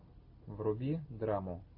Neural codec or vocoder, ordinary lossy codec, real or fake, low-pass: none; AAC, 48 kbps; real; 5.4 kHz